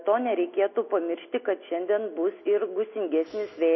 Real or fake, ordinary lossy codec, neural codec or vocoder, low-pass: real; MP3, 24 kbps; none; 7.2 kHz